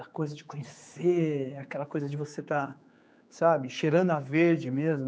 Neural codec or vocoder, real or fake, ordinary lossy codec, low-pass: codec, 16 kHz, 4 kbps, X-Codec, HuBERT features, trained on general audio; fake; none; none